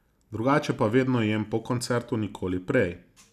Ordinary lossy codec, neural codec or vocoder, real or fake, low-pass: none; none; real; 14.4 kHz